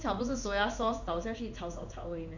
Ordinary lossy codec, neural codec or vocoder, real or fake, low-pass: none; codec, 16 kHz in and 24 kHz out, 1 kbps, XY-Tokenizer; fake; 7.2 kHz